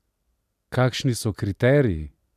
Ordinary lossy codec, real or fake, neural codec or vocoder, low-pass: none; real; none; 14.4 kHz